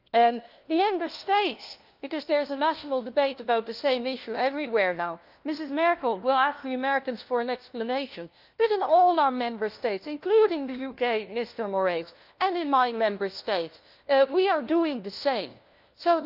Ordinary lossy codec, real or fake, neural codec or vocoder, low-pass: Opus, 32 kbps; fake; codec, 16 kHz, 1 kbps, FunCodec, trained on LibriTTS, 50 frames a second; 5.4 kHz